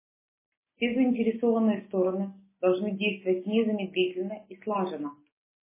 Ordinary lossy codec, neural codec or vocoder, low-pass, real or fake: MP3, 16 kbps; none; 3.6 kHz; real